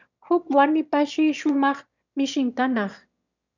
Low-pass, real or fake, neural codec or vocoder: 7.2 kHz; fake; autoencoder, 22.05 kHz, a latent of 192 numbers a frame, VITS, trained on one speaker